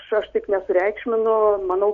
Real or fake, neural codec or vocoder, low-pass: real; none; 7.2 kHz